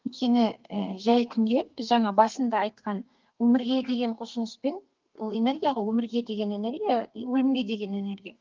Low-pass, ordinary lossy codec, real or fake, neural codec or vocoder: 7.2 kHz; Opus, 32 kbps; fake; codec, 32 kHz, 1.9 kbps, SNAC